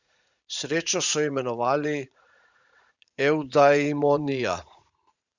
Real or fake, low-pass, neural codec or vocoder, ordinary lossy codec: fake; 7.2 kHz; vocoder, 44.1 kHz, 80 mel bands, Vocos; Opus, 64 kbps